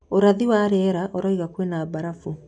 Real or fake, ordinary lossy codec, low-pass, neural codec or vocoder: real; none; 9.9 kHz; none